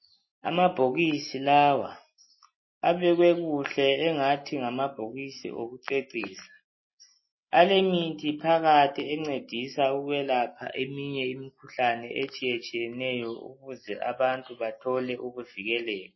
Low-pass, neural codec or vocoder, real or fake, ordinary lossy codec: 7.2 kHz; none; real; MP3, 24 kbps